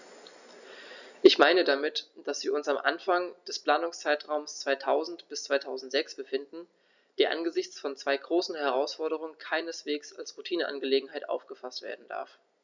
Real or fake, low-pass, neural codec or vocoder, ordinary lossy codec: real; 7.2 kHz; none; none